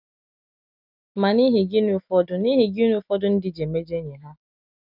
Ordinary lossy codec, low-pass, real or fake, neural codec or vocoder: none; 5.4 kHz; real; none